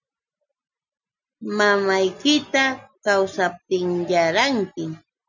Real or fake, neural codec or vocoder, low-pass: real; none; 7.2 kHz